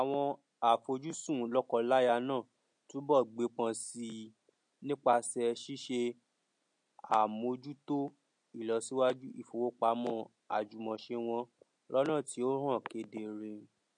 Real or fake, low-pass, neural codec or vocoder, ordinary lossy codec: real; 9.9 kHz; none; MP3, 48 kbps